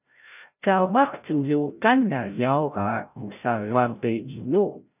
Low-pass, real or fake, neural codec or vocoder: 3.6 kHz; fake; codec, 16 kHz, 0.5 kbps, FreqCodec, larger model